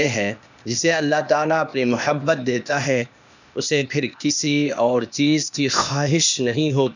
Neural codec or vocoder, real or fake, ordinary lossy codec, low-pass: codec, 16 kHz, 0.8 kbps, ZipCodec; fake; none; 7.2 kHz